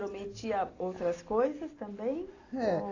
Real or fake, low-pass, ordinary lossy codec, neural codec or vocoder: real; 7.2 kHz; AAC, 32 kbps; none